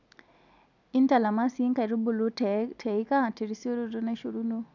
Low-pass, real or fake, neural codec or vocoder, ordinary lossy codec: 7.2 kHz; real; none; none